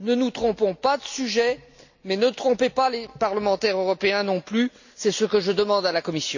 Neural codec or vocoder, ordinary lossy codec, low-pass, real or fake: none; none; 7.2 kHz; real